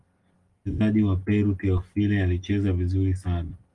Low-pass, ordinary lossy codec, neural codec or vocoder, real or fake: 10.8 kHz; Opus, 24 kbps; none; real